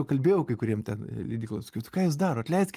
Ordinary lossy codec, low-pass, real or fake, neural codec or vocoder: Opus, 32 kbps; 14.4 kHz; real; none